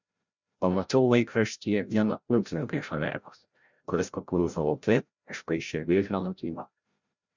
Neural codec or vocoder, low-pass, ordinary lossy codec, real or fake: codec, 16 kHz, 0.5 kbps, FreqCodec, larger model; 7.2 kHz; Opus, 64 kbps; fake